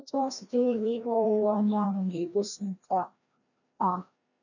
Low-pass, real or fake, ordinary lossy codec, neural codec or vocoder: 7.2 kHz; fake; none; codec, 16 kHz, 1 kbps, FreqCodec, larger model